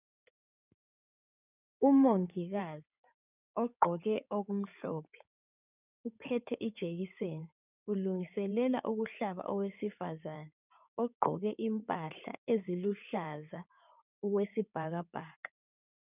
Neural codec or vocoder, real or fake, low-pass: codec, 16 kHz in and 24 kHz out, 2.2 kbps, FireRedTTS-2 codec; fake; 3.6 kHz